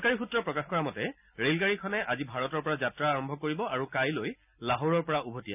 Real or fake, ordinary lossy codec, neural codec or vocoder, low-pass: real; none; none; 3.6 kHz